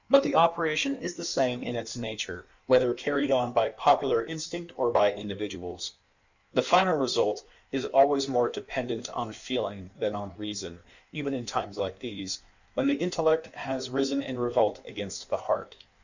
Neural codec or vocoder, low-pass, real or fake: codec, 16 kHz in and 24 kHz out, 1.1 kbps, FireRedTTS-2 codec; 7.2 kHz; fake